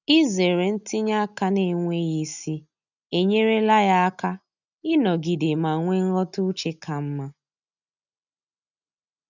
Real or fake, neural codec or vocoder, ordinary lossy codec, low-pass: real; none; none; 7.2 kHz